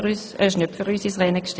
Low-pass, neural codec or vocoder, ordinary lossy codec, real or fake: none; none; none; real